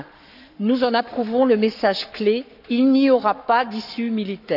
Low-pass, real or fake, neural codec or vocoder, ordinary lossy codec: 5.4 kHz; fake; codec, 44.1 kHz, 7.8 kbps, Pupu-Codec; none